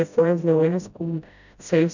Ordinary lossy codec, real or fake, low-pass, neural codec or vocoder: none; fake; 7.2 kHz; codec, 16 kHz, 0.5 kbps, FreqCodec, smaller model